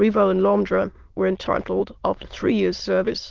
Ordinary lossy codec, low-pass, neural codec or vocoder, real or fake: Opus, 16 kbps; 7.2 kHz; autoencoder, 22.05 kHz, a latent of 192 numbers a frame, VITS, trained on many speakers; fake